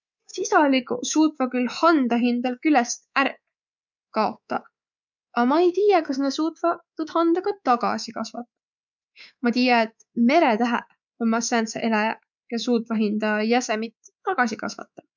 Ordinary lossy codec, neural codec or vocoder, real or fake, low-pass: none; codec, 24 kHz, 3.1 kbps, DualCodec; fake; 7.2 kHz